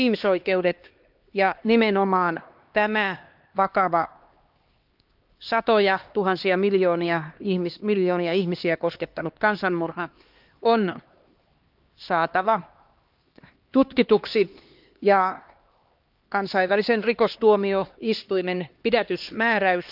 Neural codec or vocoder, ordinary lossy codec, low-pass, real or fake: codec, 16 kHz, 2 kbps, X-Codec, HuBERT features, trained on LibriSpeech; Opus, 32 kbps; 5.4 kHz; fake